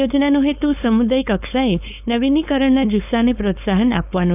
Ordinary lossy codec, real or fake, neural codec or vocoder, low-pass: none; fake; codec, 16 kHz, 4.8 kbps, FACodec; 3.6 kHz